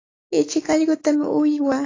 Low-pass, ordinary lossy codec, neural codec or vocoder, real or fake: 7.2 kHz; AAC, 32 kbps; autoencoder, 48 kHz, 128 numbers a frame, DAC-VAE, trained on Japanese speech; fake